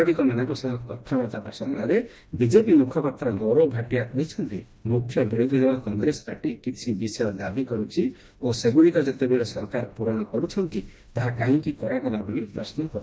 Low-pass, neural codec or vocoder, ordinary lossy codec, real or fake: none; codec, 16 kHz, 1 kbps, FreqCodec, smaller model; none; fake